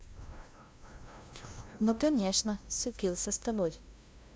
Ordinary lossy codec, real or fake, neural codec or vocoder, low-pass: none; fake; codec, 16 kHz, 0.5 kbps, FunCodec, trained on LibriTTS, 25 frames a second; none